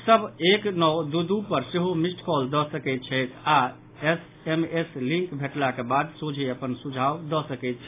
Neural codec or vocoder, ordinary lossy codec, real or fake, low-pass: none; AAC, 24 kbps; real; 3.6 kHz